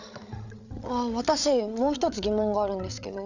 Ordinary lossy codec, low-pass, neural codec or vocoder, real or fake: none; 7.2 kHz; codec, 16 kHz, 8 kbps, FreqCodec, larger model; fake